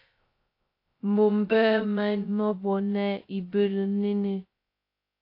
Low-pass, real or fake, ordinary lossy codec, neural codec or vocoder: 5.4 kHz; fake; AAC, 32 kbps; codec, 16 kHz, 0.2 kbps, FocalCodec